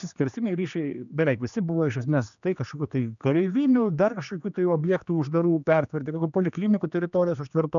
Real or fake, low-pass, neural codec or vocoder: fake; 7.2 kHz; codec, 16 kHz, 2 kbps, X-Codec, HuBERT features, trained on general audio